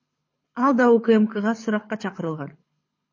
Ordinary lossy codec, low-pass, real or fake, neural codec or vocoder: MP3, 32 kbps; 7.2 kHz; fake; codec, 24 kHz, 6 kbps, HILCodec